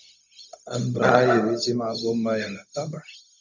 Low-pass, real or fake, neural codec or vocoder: 7.2 kHz; fake; codec, 16 kHz, 0.4 kbps, LongCat-Audio-Codec